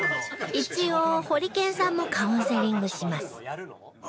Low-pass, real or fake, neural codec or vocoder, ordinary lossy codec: none; real; none; none